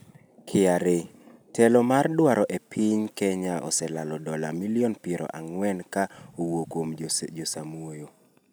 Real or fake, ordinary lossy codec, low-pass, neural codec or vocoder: real; none; none; none